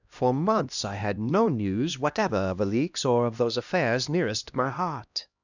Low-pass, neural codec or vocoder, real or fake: 7.2 kHz; codec, 16 kHz, 1 kbps, X-Codec, HuBERT features, trained on LibriSpeech; fake